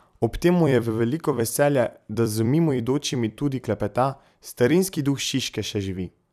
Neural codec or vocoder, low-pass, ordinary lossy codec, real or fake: vocoder, 44.1 kHz, 128 mel bands every 256 samples, BigVGAN v2; 14.4 kHz; none; fake